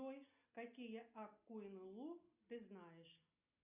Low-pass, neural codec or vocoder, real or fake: 3.6 kHz; none; real